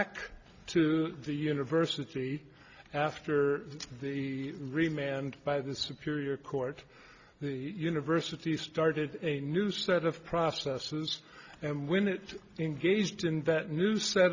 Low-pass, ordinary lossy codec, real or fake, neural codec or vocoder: 7.2 kHz; Opus, 64 kbps; real; none